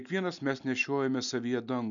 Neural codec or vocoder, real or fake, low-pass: none; real; 7.2 kHz